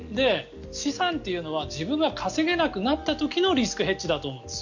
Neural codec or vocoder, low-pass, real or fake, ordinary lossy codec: none; 7.2 kHz; real; none